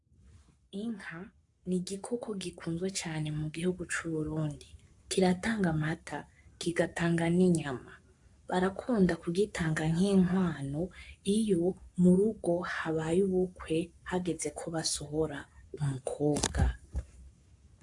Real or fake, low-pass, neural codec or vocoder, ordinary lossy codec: fake; 10.8 kHz; codec, 44.1 kHz, 7.8 kbps, Pupu-Codec; AAC, 64 kbps